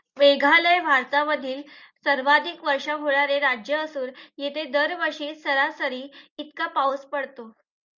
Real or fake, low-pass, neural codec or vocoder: real; 7.2 kHz; none